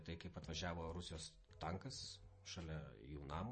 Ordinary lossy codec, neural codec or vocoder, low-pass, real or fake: MP3, 32 kbps; none; 10.8 kHz; real